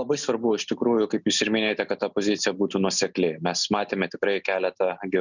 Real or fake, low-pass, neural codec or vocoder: real; 7.2 kHz; none